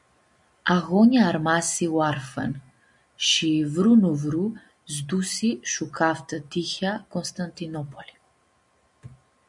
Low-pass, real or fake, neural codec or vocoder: 10.8 kHz; real; none